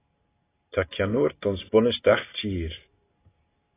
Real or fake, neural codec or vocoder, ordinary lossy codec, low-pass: real; none; AAC, 16 kbps; 3.6 kHz